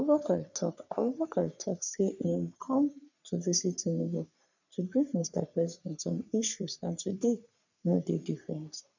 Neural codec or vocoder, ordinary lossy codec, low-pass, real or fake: codec, 44.1 kHz, 3.4 kbps, Pupu-Codec; none; 7.2 kHz; fake